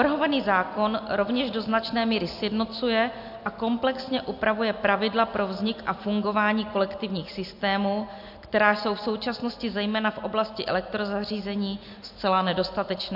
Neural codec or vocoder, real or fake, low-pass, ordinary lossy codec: none; real; 5.4 kHz; AAC, 48 kbps